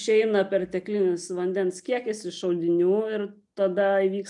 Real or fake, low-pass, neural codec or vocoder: real; 10.8 kHz; none